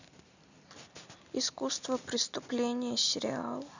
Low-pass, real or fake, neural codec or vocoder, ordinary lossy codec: 7.2 kHz; real; none; none